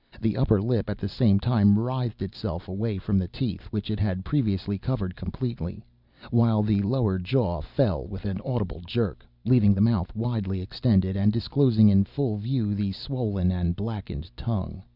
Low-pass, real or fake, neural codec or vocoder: 5.4 kHz; real; none